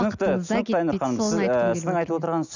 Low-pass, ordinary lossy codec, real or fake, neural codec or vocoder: 7.2 kHz; none; real; none